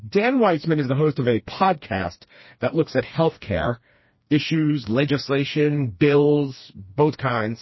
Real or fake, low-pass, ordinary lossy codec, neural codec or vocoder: fake; 7.2 kHz; MP3, 24 kbps; codec, 16 kHz, 2 kbps, FreqCodec, smaller model